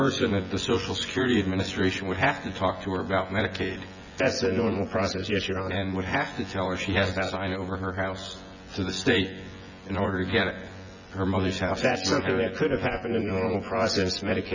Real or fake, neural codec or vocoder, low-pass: fake; vocoder, 24 kHz, 100 mel bands, Vocos; 7.2 kHz